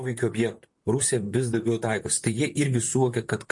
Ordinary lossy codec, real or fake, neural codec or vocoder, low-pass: MP3, 48 kbps; fake; vocoder, 44.1 kHz, 128 mel bands, Pupu-Vocoder; 10.8 kHz